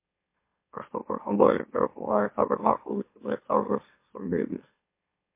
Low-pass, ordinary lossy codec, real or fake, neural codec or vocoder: 3.6 kHz; MP3, 24 kbps; fake; autoencoder, 44.1 kHz, a latent of 192 numbers a frame, MeloTTS